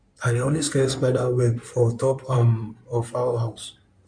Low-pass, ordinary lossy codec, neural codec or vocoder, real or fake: 9.9 kHz; none; codec, 16 kHz in and 24 kHz out, 2.2 kbps, FireRedTTS-2 codec; fake